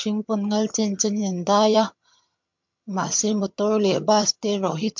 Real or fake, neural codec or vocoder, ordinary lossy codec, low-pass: fake; vocoder, 22.05 kHz, 80 mel bands, HiFi-GAN; MP3, 48 kbps; 7.2 kHz